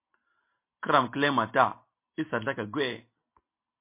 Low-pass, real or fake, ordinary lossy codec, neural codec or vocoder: 3.6 kHz; real; MP3, 24 kbps; none